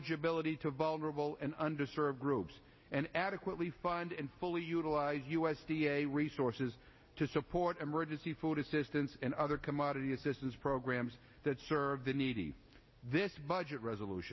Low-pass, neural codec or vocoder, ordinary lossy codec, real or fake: 7.2 kHz; none; MP3, 24 kbps; real